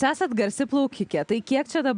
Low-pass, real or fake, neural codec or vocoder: 9.9 kHz; real; none